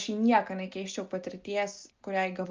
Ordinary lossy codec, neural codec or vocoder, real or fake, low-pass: Opus, 24 kbps; none; real; 7.2 kHz